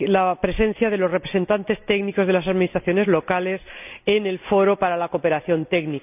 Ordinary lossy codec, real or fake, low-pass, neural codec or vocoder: none; real; 3.6 kHz; none